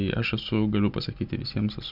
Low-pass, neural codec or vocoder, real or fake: 5.4 kHz; none; real